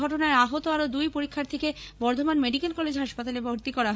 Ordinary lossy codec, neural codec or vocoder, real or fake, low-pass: none; codec, 16 kHz, 16 kbps, FreqCodec, larger model; fake; none